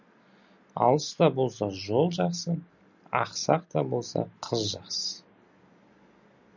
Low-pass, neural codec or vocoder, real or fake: 7.2 kHz; none; real